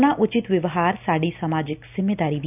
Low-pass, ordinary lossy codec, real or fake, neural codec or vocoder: 3.6 kHz; none; real; none